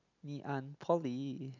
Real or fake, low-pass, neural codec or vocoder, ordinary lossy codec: real; 7.2 kHz; none; none